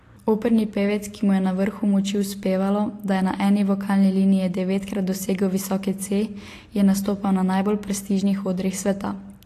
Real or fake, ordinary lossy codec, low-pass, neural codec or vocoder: real; AAC, 48 kbps; 14.4 kHz; none